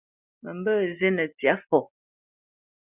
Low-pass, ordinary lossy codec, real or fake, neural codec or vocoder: 3.6 kHz; Opus, 64 kbps; real; none